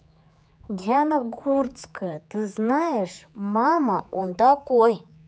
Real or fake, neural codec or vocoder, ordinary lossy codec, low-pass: fake; codec, 16 kHz, 4 kbps, X-Codec, HuBERT features, trained on general audio; none; none